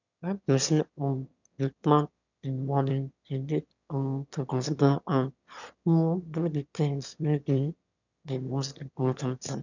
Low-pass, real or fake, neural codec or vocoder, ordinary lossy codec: 7.2 kHz; fake; autoencoder, 22.05 kHz, a latent of 192 numbers a frame, VITS, trained on one speaker; none